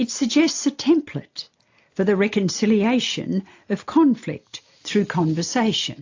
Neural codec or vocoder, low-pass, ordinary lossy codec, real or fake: none; 7.2 kHz; AAC, 48 kbps; real